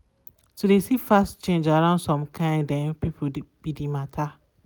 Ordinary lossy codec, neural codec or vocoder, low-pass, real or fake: none; none; none; real